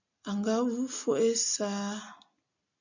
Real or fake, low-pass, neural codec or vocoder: real; 7.2 kHz; none